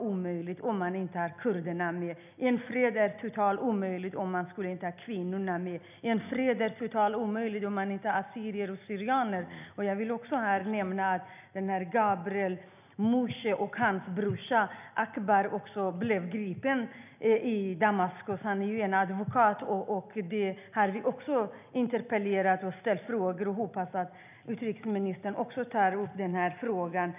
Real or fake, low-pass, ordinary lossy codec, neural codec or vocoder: real; 3.6 kHz; none; none